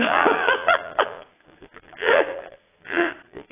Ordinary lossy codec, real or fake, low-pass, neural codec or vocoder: AAC, 16 kbps; fake; 3.6 kHz; vocoder, 22.05 kHz, 80 mel bands, Vocos